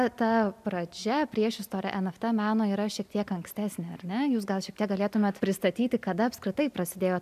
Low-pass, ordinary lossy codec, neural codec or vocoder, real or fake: 14.4 kHz; AAC, 96 kbps; none; real